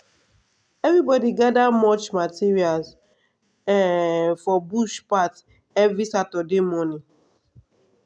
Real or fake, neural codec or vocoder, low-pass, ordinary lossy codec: real; none; 9.9 kHz; none